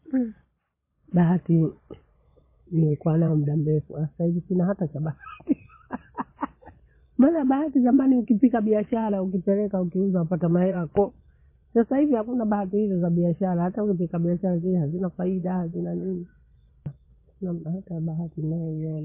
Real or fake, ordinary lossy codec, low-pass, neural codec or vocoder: fake; MP3, 24 kbps; 3.6 kHz; vocoder, 22.05 kHz, 80 mel bands, Vocos